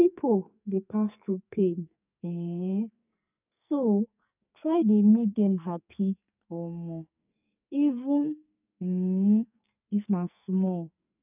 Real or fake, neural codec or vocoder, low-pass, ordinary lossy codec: fake; codec, 44.1 kHz, 2.6 kbps, SNAC; 3.6 kHz; none